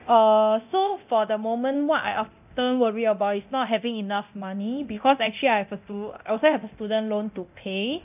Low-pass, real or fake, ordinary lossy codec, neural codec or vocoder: 3.6 kHz; fake; none; codec, 24 kHz, 0.9 kbps, DualCodec